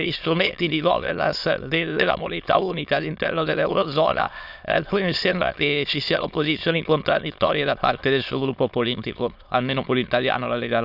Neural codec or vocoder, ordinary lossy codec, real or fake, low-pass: autoencoder, 22.05 kHz, a latent of 192 numbers a frame, VITS, trained on many speakers; none; fake; 5.4 kHz